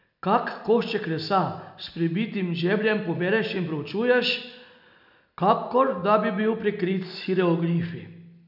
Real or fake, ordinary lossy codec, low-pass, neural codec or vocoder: real; none; 5.4 kHz; none